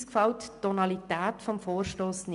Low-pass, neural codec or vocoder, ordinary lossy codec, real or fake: 10.8 kHz; none; MP3, 96 kbps; real